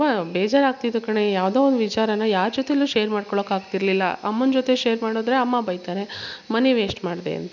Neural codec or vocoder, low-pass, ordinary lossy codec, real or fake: none; 7.2 kHz; none; real